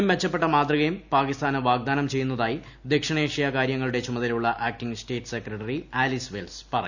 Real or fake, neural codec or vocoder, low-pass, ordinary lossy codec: real; none; 7.2 kHz; none